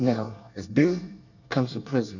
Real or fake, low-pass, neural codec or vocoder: fake; 7.2 kHz; codec, 24 kHz, 1 kbps, SNAC